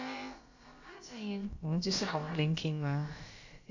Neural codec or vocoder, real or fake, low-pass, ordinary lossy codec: codec, 16 kHz, about 1 kbps, DyCAST, with the encoder's durations; fake; 7.2 kHz; AAC, 48 kbps